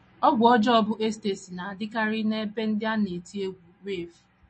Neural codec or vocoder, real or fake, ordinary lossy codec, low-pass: none; real; MP3, 32 kbps; 9.9 kHz